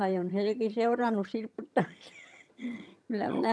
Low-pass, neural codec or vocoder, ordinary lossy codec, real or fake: none; vocoder, 22.05 kHz, 80 mel bands, HiFi-GAN; none; fake